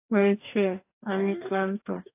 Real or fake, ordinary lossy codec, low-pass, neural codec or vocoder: fake; AAC, 24 kbps; 3.6 kHz; codec, 44.1 kHz, 2.6 kbps, DAC